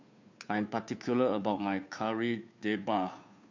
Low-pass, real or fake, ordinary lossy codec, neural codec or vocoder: 7.2 kHz; fake; MP3, 64 kbps; codec, 16 kHz, 2 kbps, FunCodec, trained on Chinese and English, 25 frames a second